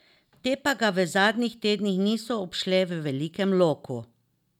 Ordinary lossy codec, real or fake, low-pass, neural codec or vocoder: none; real; 19.8 kHz; none